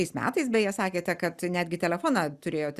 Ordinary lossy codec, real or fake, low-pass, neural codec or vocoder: Opus, 64 kbps; real; 14.4 kHz; none